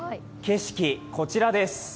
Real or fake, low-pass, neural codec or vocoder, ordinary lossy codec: real; none; none; none